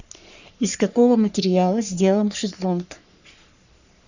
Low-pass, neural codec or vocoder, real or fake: 7.2 kHz; codec, 44.1 kHz, 3.4 kbps, Pupu-Codec; fake